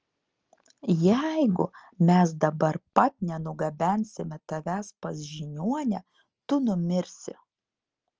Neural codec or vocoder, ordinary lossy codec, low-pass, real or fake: none; Opus, 24 kbps; 7.2 kHz; real